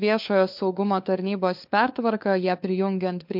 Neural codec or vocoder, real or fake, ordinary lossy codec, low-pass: codec, 16 kHz, 4 kbps, FunCodec, trained on LibriTTS, 50 frames a second; fake; MP3, 48 kbps; 5.4 kHz